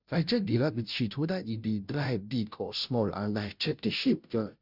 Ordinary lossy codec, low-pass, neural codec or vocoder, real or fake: none; 5.4 kHz; codec, 16 kHz, 0.5 kbps, FunCodec, trained on Chinese and English, 25 frames a second; fake